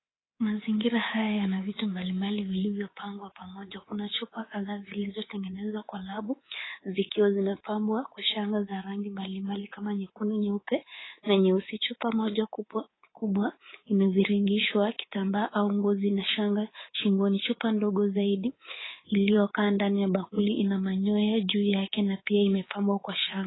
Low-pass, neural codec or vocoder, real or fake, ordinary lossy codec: 7.2 kHz; codec, 24 kHz, 3.1 kbps, DualCodec; fake; AAC, 16 kbps